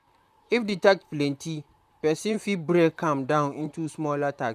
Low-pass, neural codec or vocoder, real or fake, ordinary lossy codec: 14.4 kHz; vocoder, 44.1 kHz, 128 mel bands, Pupu-Vocoder; fake; none